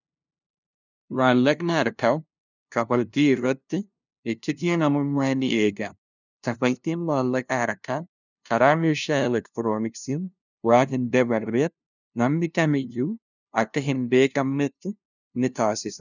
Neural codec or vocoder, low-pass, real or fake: codec, 16 kHz, 0.5 kbps, FunCodec, trained on LibriTTS, 25 frames a second; 7.2 kHz; fake